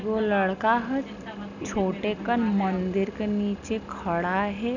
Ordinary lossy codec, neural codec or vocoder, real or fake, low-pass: none; none; real; 7.2 kHz